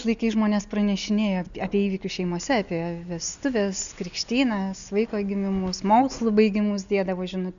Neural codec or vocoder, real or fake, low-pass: none; real; 7.2 kHz